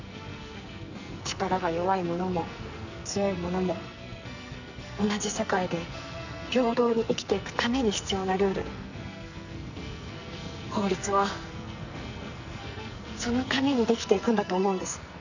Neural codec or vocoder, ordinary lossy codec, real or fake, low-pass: codec, 44.1 kHz, 2.6 kbps, SNAC; none; fake; 7.2 kHz